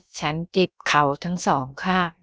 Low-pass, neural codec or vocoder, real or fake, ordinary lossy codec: none; codec, 16 kHz, about 1 kbps, DyCAST, with the encoder's durations; fake; none